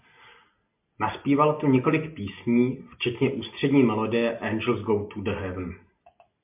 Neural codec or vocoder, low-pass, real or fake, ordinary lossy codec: none; 3.6 kHz; real; MP3, 32 kbps